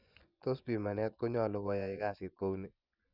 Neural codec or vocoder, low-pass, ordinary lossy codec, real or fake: vocoder, 24 kHz, 100 mel bands, Vocos; 5.4 kHz; none; fake